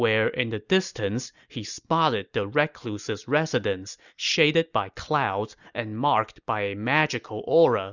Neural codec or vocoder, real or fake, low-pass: none; real; 7.2 kHz